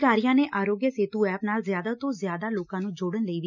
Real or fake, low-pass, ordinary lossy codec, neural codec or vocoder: real; 7.2 kHz; none; none